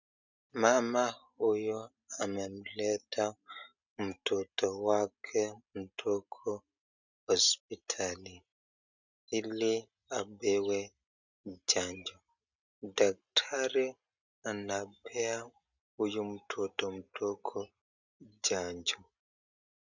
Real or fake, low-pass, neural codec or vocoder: real; 7.2 kHz; none